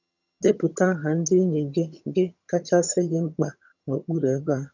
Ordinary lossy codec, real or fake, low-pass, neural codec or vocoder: none; fake; 7.2 kHz; vocoder, 22.05 kHz, 80 mel bands, HiFi-GAN